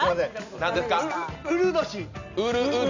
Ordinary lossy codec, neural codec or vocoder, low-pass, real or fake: none; none; 7.2 kHz; real